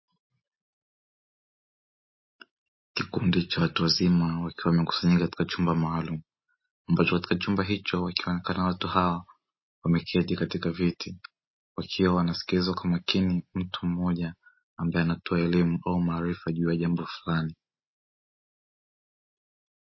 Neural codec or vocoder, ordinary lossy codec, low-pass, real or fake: none; MP3, 24 kbps; 7.2 kHz; real